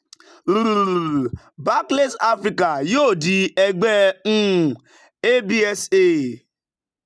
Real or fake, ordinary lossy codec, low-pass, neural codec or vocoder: real; none; none; none